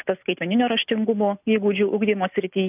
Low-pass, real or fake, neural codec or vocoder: 3.6 kHz; real; none